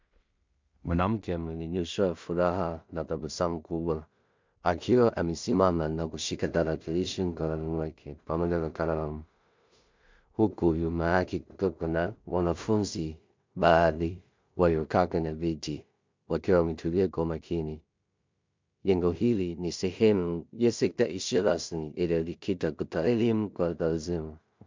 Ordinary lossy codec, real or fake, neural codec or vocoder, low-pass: MP3, 64 kbps; fake; codec, 16 kHz in and 24 kHz out, 0.4 kbps, LongCat-Audio-Codec, two codebook decoder; 7.2 kHz